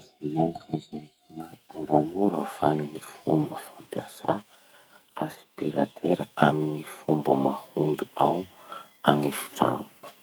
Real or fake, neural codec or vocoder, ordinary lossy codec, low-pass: fake; codec, 44.1 kHz, 2.6 kbps, SNAC; none; none